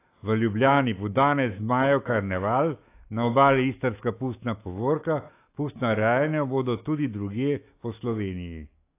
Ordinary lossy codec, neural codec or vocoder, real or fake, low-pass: AAC, 24 kbps; autoencoder, 48 kHz, 128 numbers a frame, DAC-VAE, trained on Japanese speech; fake; 3.6 kHz